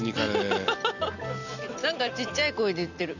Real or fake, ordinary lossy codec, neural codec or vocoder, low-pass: real; none; none; 7.2 kHz